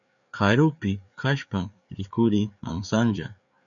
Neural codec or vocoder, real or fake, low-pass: codec, 16 kHz, 4 kbps, FreqCodec, larger model; fake; 7.2 kHz